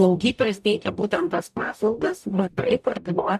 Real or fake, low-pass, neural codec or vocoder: fake; 14.4 kHz; codec, 44.1 kHz, 0.9 kbps, DAC